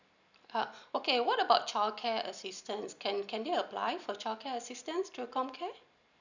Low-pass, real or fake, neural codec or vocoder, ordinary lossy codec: 7.2 kHz; real; none; none